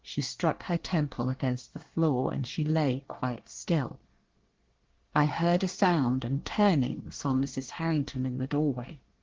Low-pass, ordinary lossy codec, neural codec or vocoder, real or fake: 7.2 kHz; Opus, 16 kbps; codec, 24 kHz, 1 kbps, SNAC; fake